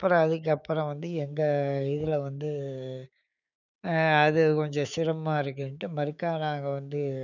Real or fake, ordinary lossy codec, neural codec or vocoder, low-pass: fake; none; codec, 16 kHz, 6 kbps, DAC; 7.2 kHz